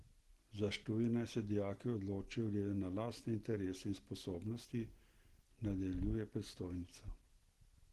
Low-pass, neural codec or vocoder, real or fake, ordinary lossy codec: 14.4 kHz; none; real; Opus, 16 kbps